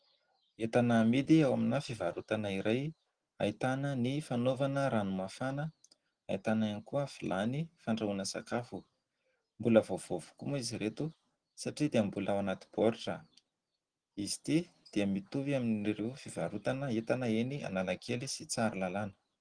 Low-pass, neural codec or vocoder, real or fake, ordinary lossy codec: 9.9 kHz; none; real; Opus, 16 kbps